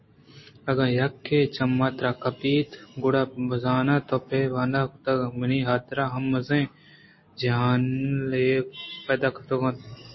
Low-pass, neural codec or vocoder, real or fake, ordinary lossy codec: 7.2 kHz; none; real; MP3, 24 kbps